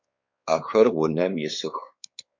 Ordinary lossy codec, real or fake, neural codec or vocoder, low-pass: MP3, 48 kbps; fake; codec, 16 kHz, 4 kbps, X-Codec, WavLM features, trained on Multilingual LibriSpeech; 7.2 kHz